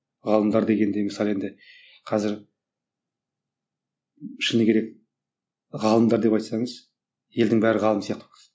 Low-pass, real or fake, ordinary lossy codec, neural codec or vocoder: none; real; none; none